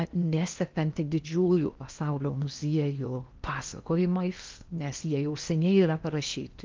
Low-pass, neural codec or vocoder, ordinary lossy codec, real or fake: 7.2 kHz; codec, 16 kHz in and 24 kHz out, 0.8 kbps, FocalCodec, streaming, 65536 codes; Opus, 24 kbps; fake